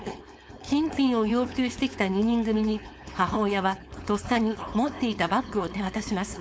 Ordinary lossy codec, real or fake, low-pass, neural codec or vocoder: none; fake; none; codec, 16 kHz, 4.8 kbps, FACodec